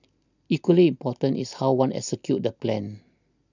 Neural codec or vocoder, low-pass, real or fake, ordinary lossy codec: none; 7.2 kHz; real; none